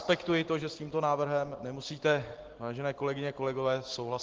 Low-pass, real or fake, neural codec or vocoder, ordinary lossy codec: 7.2 kHz; real; none; Opus, 16 kbps